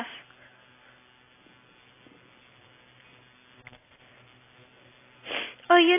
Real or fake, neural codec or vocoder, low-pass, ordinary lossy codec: real; none; 3.6 kHz; AAC, 16 kbps